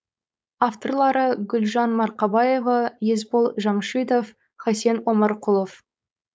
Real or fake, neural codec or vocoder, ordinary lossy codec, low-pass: fake; codec, 16 kHz, 4.8 kbps, FACodec; none; none